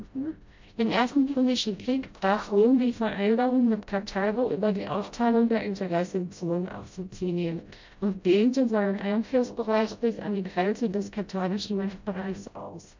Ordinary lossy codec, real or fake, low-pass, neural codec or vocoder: MP3, 64 kbps; fake; 7.2 kHz; codec, 16 kHz, 0.5 kbps, FreqCodec, smaller model